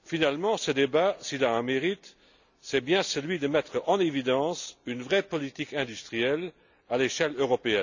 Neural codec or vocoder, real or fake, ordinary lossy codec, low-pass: none; real; none; 7.2 kHz